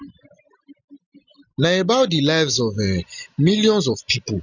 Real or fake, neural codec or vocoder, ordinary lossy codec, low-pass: real; none; none; 7.2 kHz